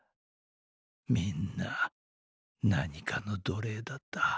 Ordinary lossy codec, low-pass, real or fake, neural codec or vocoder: none; none; real; none